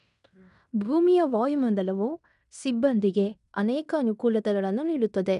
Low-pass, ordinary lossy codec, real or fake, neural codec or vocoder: 10.8 kHz; none; fake; codec, 16 kHz in and 24 kHz out, 0.9 kbps, LongCat-Audio-Codec, fine tuned four codebook decoder